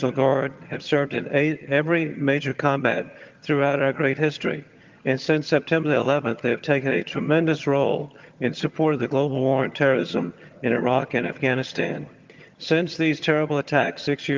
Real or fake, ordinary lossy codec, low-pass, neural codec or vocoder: fake; Opus, 32 kbps; 7.2 kHz; vocoder, 22.05 kHz, 80 mel bands, HiFi-GAN